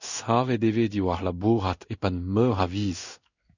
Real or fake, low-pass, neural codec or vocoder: fake; 7.2 kHz; codec, 16 kHz in and 24 kHz out, 1 kbps, XY-Tokenizer